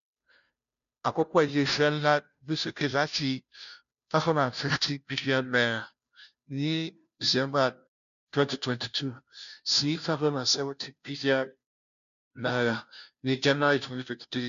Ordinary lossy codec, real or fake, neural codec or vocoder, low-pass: none; fake; codec, 16 kHz, 0.5 kbps, FunCodec, trained on Chinese and English, 25 frames a second; 7.2 kHz